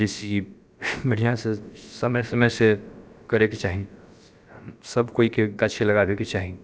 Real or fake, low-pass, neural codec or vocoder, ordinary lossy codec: fake; none; codec, 16 kHz, about 1 kbps, DyCAST, with the encoder's durations; none